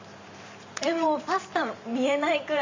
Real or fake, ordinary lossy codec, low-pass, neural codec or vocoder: fake; MP3, 64 kbps; 7.2 kHz; vocoder, 44.1 kHz, 128 mel bands every 512 samples, BigVGAN v2